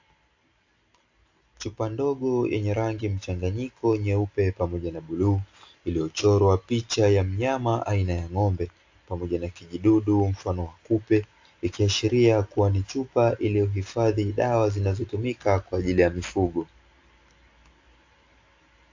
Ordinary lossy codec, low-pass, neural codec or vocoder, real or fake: AAC, 48 kbps; 7.2 kHz; none; real